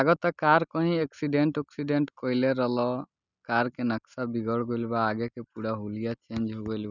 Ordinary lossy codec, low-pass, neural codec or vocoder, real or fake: none; 7.2 kHz; none; real